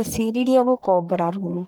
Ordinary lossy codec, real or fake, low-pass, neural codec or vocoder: none; fake; none; codec, 44.1 kHz, 1.7 kbps, Pupu-Codec